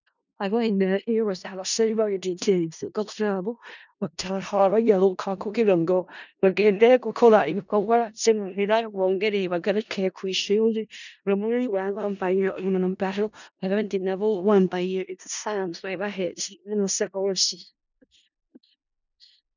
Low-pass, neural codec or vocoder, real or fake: 7.2 kHz; codec, 16 kHz in and 24 kHz out, 0.4 kbps, LongCat-Audio-Codec, four codebook decoder; fake